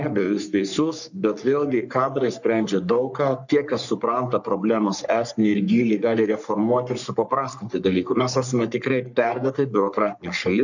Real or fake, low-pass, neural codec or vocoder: fake; 7.2 kHz; codec, 44.1 kHz, 3.4 kbps, Pupu-Codec